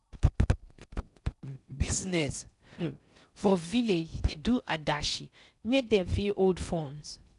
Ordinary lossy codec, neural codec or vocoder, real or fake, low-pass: none; codec, 16 kHz in and 24 kHz out, 0.6 kbps, FocalCodec, streaming, 4096 codes; fake; 10.8 kHz